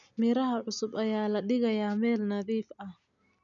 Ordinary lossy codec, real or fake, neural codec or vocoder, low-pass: none; real; none; 7.2 kHz